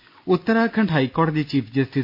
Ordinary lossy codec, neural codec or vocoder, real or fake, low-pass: MP3, 32 kbps; none; real; 5.4 kHz